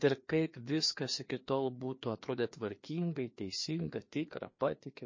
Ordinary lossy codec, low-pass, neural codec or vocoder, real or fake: MP3, 32 kbps; 7.2 kHz; codec, 16 kHz, 2 kbps, FreqCodec, larger model; fake